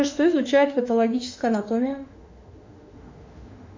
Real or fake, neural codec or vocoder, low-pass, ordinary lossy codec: fake; autoencoder, 48 kHz, 32 numbers a frame, DAC-VAE, trained on Japanese speech; 7.2 kHz; MP3, 64 kbps